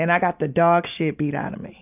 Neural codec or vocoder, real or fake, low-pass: none; real; 3.6 kHz